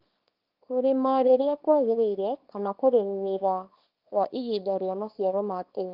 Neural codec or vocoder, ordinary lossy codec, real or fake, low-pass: codec, 24 kHz, 0.9 kbps, WavTokenizer, small release; Opus, 32 kbps; fake; 5.4 kHz